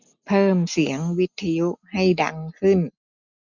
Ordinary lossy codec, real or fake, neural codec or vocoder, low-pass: none; real; none; 7.2 kHz